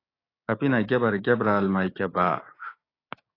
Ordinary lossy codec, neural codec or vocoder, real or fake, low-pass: AAC, 24 kbps; codec, 16 kHz, 6 kbps, DAC; fake; 5.4 kHz